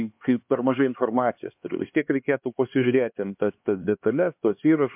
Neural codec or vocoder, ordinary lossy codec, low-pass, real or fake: codec, 16 kHz, 2 kbps, X-Codec, HuBERT features, trained on LibriSpeech; MP3, 32 kbps; 3.6 kHz; fake